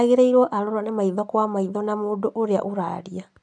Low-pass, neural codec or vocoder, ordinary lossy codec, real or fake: 9.9 kHz; none; none; real